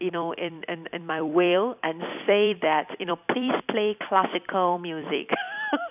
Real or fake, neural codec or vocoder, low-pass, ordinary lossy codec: fake; vocoder, 44.1 kHz, 128 mel bands every 256 samples, BigVGAN v2; 3.6 kHz; none